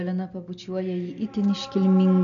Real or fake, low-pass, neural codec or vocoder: real; 7.2 kHz; none